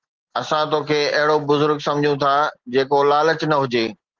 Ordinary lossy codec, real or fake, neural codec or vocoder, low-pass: Opus, 16 kbps; real; none; 7.2 kHz